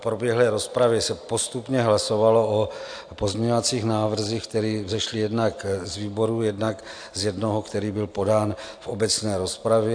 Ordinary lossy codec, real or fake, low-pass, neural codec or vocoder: AAC, 64 kbps; real; 9.9 kHz; none